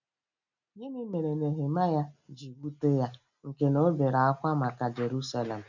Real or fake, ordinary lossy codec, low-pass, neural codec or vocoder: real; none; 7.2 kHz; none